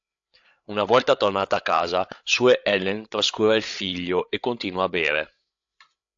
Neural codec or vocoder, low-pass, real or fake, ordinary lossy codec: codec, 16 kHz, 8 kbps, FreqCodec, larger model; 7.2 kHz; fake; AAC, 64 kbps